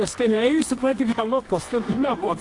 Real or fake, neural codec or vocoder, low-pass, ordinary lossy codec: fake; codec, 24 kHz, 0.9 kbps, WavTokenizer, medium music audio release; 10.8 kHz; AAC, 48 kbps